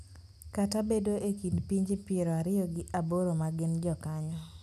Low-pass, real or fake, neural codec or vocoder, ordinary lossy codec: 14.4 kHz; real; none; none